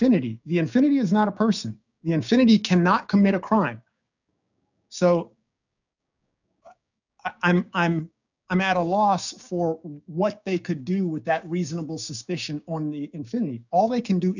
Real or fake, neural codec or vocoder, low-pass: fake; codec, 16 kHz, 6 kbps, DAC; 7.2 kHz